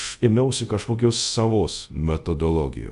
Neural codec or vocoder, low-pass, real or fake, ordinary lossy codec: codec, 24 kHz, 0.5 kbps, DualCodec; 10.8 kHz; fake; AAC, 96 kbps